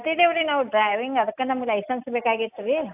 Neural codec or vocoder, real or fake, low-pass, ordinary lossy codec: none; real; 3.6 kHz; AAC, 32 kbps